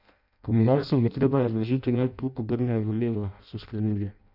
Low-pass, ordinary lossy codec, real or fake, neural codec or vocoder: 5.4 kHz; none; fake; codec, 16 kHz in and 24 kHz out, 0.6 kbps, FireRedTTS-2 codec